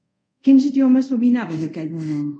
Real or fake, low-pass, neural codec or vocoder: fake; 9.9 kHz; codec, 24 kHz, 0.5 kbps, DualCodec